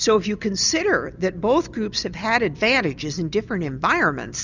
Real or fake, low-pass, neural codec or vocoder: real; 7.2 kHz; none